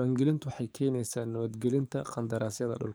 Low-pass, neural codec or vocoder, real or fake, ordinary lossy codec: none; codec, 44.1 kHz, 7.8 kbps, DAC; fake; none